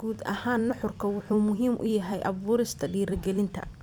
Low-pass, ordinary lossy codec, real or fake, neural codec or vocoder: 19.8 kHz; none; fake; vocoder, 44.1 kHz, 128 mel bands every 256 samples, BigVGAN v2